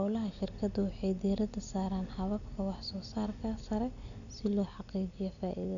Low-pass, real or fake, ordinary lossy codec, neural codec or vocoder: 7.2 kHz; real; none; none